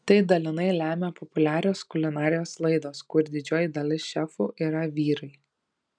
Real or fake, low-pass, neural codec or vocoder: real; 9.9 kHz; none